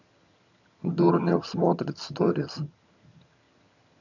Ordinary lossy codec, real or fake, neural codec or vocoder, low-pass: none; fake; vocoder, 22.05 kHz, 80 mel bands, HiFi-GAN; 7.2 kHz